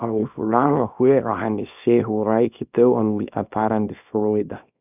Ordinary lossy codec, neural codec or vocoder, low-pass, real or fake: none; codec, 24 kHz, 0.9 kbps, WavTokenizer, small release; 3.6 kHz; fake